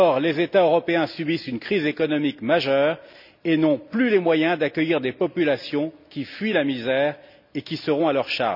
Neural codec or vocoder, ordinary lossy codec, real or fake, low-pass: none; none; real; 5.4 kHz